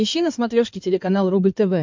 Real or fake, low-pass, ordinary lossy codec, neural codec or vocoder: fake; 7.2 kHz; MP3, 64 kbps; codec, 16 kHz in and 24 kHz out, 2.2 kbps, FireRedTTS-2 codec